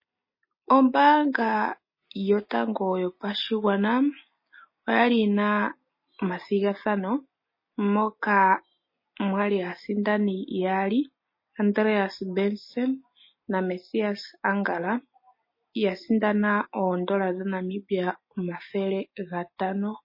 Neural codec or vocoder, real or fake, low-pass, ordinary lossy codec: none; real; 5.4 kHz; MP3, 24 kbps